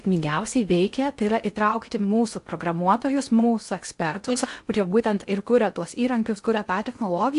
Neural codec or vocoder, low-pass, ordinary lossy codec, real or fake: codec, 16 kHz in and 24 kHz out, 0.6 kbps, FocalCodec, streaming, 4096 codes; 10.8 kHz; AAC, 64 kbps; fake